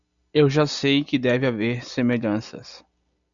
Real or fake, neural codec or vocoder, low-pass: real; none; 7.2 kHz